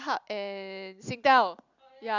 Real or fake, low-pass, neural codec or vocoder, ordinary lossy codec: fake; 7.2 kHz; vocoder, 44.1 kHz, 128 mel bands every 256 samples, BigVGAN v2; none